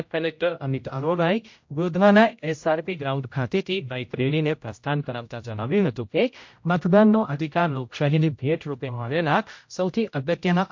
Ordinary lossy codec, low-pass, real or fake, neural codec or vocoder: MP3, 48 kbps; 7.2 kHz; fake; codec, 16 kHz, 0.5 kbps, X-Codec, HuBERT features, trained on general audio